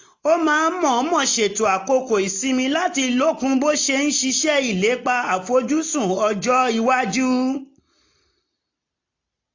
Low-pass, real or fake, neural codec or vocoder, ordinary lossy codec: 7.2 kHz; real; none; AAC, 48 kbps